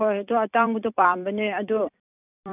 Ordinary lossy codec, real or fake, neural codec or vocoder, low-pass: none; fake; vocoder, 44.1 kHz, 128 mel bands every 256 samples, BigVGAN v2; 3.6 kHz